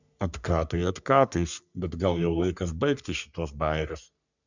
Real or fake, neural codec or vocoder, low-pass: fake; codec, 44.1 kHz, 3.4 kbps, Pupu-Codec; 7.2 kHz